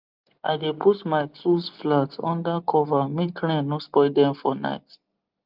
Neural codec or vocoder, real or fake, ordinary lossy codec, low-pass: none; real; Opus, 32 kbps; 5.4 kHz